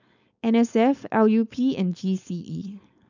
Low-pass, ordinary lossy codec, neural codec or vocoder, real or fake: 7.2 kHz; none; codec, 16 kHz, 4.8 kbps, FACodec; fake